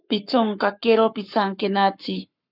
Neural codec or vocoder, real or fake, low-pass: codec, 44.1 kHz, 7.8 kbps, Pupu-Codec; fake; 5.4 kHz